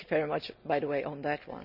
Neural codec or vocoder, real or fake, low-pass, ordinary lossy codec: none; real; 5.4 kHz; none